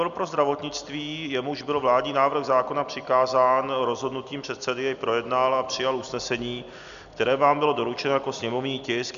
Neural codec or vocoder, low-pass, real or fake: none; 7.2 kHz; real